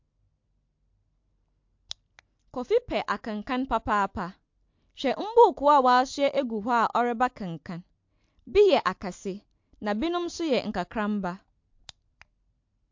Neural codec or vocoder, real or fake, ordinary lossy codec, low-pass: none; real; MP3, 48 kbps; 7.2 kHz